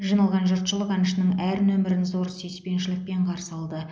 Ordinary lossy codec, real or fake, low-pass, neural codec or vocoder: none; real; none; none